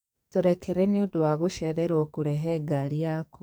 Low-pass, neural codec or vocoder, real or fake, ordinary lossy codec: none; codec, 44.1 kHz, 2.6 kbps, SNAC; fake; none